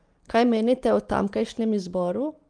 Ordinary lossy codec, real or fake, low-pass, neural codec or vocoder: Opus, 32 kbps; real; 9.9 kHz; none